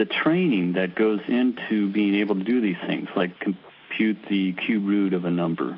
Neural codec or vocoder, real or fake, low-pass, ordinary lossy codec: none; real; 5.4 kHz; AAC, 32 kbps